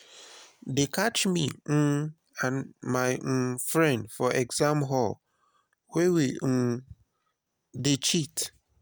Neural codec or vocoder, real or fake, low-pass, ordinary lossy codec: none; real; none; none